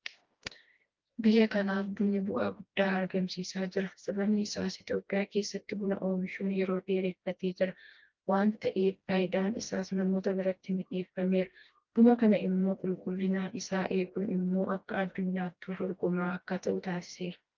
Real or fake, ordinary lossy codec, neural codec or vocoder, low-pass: fake; Opus, 24 kbps; codec, 16 kHz, 1 kbps, FreqCodec, smaller model; 7.2 kHz